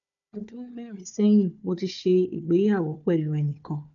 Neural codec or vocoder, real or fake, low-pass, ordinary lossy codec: codec, 16 kHz, 4 kbps, FunCodec, trained on Chinese and English, 50 frames a second; fake; 7.2 kHz; none